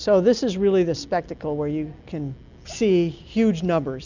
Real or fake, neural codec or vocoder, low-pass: real; none; 7.2 kHz